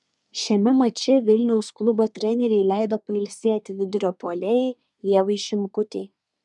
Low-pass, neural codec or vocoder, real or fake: 10.8 kHz; codec, 24 kHz, 1 kbps, SNAC; fake